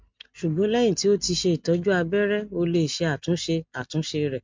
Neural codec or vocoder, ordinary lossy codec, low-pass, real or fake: none; MP3, 64 kbps; 7.2 kHz; real